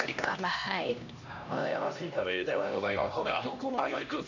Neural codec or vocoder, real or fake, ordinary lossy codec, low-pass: codec, 16 kHz, 1 kbps, X-Codec, HuBERT features, trained on LibriSpeech; fake; none; 7.2 kHz